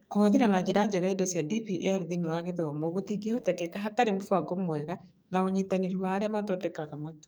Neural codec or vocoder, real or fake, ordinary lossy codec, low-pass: codec, 44.1 kHz, 2.6 kbps, SNAC; fake; none; none